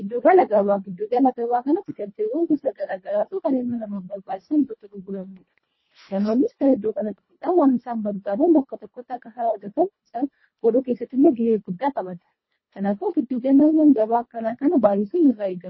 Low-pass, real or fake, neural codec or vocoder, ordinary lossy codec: 7.2 kHz; fake; codec, 24 kHz, 1.5 kbps, HILCodec; MP3, 24 kbps